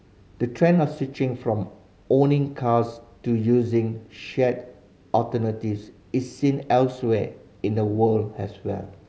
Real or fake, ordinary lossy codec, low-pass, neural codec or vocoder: real; none; none; none